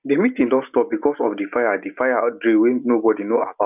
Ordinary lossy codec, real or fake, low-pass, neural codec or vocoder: none; real; 3.6 kHz; none